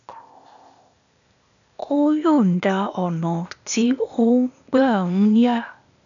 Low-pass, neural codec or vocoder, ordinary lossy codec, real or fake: 7.2 kHz; codec, 16 kHz, 0.8 kbps, ZipCodec; none; fake